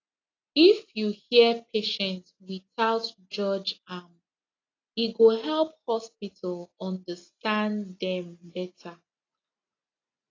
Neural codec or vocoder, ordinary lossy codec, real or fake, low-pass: none; AAC, 32 kbps; real; 7.2 kHz